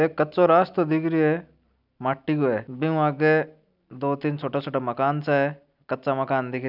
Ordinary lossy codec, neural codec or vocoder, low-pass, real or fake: none; none; 5.4 kHz; real